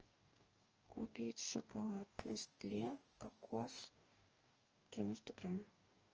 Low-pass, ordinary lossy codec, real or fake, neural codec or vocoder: 7.2 kHz; Opus, 24 kbps; fake; codec, 44.1 kHz, 2.6 kbps, DAC